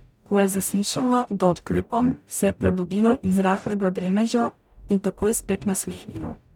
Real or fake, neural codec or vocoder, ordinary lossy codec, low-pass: fake; codec, 44.1 kHz, 0.9 kbps, DAC; none; 19.8 kHz